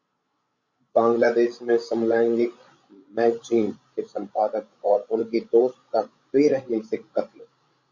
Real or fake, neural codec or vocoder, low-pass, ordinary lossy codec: fake; codec, 16 kHz, 16 kbps, FreqCodec, larger model; 7.2 kHz; Opus, 64 kbps